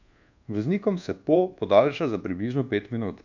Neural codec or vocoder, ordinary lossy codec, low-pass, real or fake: codec, 24 kHz, 1.2 kbps, DualCodec; none; 7.2 kHz; fake